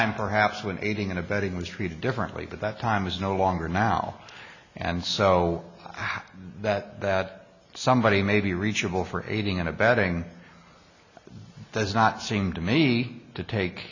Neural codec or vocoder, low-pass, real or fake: none; 7.2 kHz; real